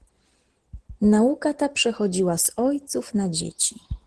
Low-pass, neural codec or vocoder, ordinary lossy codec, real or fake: 10.8 kHz; none; Opus, 16 kbps; real